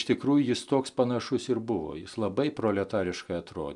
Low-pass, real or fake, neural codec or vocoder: 10.8 kHz; real; none